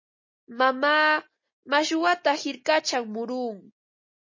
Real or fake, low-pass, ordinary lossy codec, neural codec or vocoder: real; 7.2 kHz; MP3, 32 kbps; none